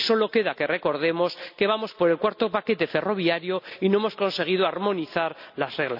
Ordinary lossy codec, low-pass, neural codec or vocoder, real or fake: none; 5.4 kHz; none; real